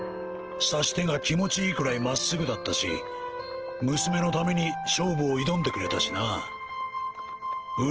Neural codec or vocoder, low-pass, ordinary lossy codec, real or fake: none; 7.2 kHz; Opus, 16 kbps; real